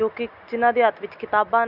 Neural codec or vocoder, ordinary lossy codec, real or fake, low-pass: none; none; real; 5.4 kHz